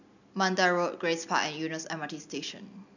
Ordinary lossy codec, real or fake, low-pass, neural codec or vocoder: none; real; 7.2 kHz; none